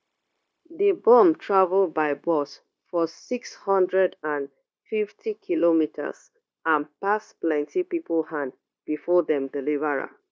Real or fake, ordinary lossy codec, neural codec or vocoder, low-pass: fake; none; codec, 16 kHz, 0.9 kbps, LongCat-Audio-Codec; none